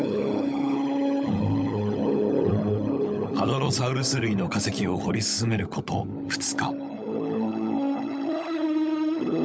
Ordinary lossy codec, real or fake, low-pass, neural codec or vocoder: none; fake; none; codec, 16 kHz, 16 kbps, FunCodec, trained on LibriTTS, 50 frames a second